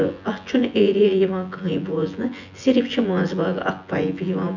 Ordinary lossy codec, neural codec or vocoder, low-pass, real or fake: none; vocoder, 24 kHz, 100 mel bands, Vocos; 7.2 kHz; fake